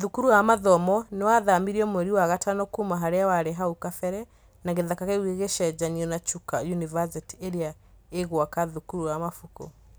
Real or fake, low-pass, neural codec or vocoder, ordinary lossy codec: real; none; none; none